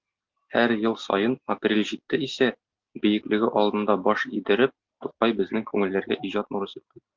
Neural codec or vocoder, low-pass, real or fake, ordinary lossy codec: none; 7.2 kHz; real; Opus, 24 kbps